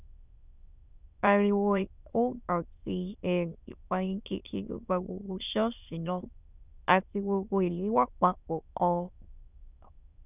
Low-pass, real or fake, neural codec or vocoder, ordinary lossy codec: 3.6 kHz; fake; autoencoder, 22.05 kHz, a latent of 192 numbers a frame, VITS, trained on many speakers; none